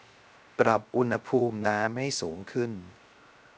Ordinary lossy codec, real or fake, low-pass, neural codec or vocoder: none; fake; none; codec, 16 kHz, 0.3 kbps, FocalCodec